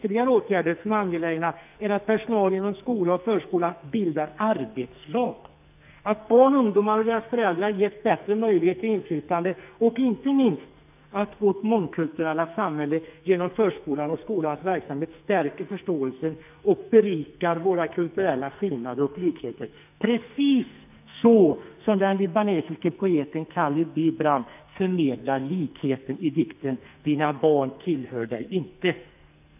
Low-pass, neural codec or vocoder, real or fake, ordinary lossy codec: 3.6 kHz; codec, 44.1 kHz, 2.6 kbps, SNAC; fake; none